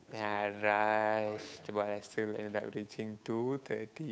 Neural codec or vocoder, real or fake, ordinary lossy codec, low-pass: codec, 16 kHz, 8 kbps, FunCodec, trained on Chinese and English, 25 frames a second; fake; none; none